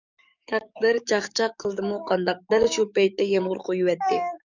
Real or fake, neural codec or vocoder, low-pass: fake; codec, 44.1 kHz, 7.8 kbps, DAC; 7.2 kHz